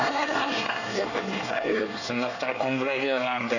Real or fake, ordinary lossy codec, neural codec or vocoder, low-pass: fake; none; codec, 24 kHz, 1 kbps, SNAC; 7.2 kHz